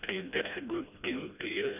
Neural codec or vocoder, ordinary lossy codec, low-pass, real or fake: codec, 16 kHz, 1 kbps, FreqCodec, smaller model; none; 3.6 kHz; fake